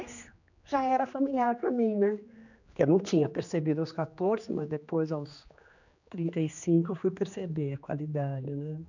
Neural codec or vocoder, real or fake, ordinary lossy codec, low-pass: codec, 16 kHz, 2 kbps, X-Codec, HuBERT features, trained on general audio; fake; none; 7.2 kHz